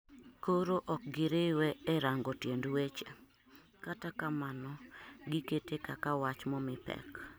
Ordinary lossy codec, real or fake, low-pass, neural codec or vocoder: none; real; none; none